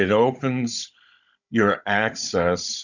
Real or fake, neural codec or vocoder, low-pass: fake; codec, 16 kHz, 16 kbps, FunCodec, trained on Chinese and English, 50 frames a second; 7.2 kHz